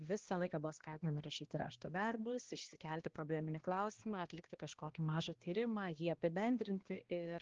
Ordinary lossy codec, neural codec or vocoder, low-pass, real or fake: Opus, 16 kbps; codec, 16 kHz, 1 kbps, X-Codec, HuBERT features, trained on balanced general audio; 7.2 kHz; fake